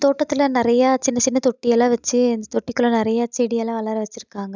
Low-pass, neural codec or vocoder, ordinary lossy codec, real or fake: 7.2 kHz; none; none; real